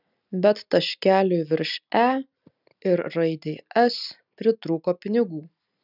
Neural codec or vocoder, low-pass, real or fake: none; 5.4 kHz; real